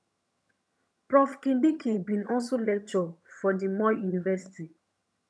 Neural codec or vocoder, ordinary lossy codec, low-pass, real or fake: vocoder, 22.05 kHz, 80 mel bands, HiFi-GAN; none; none; fake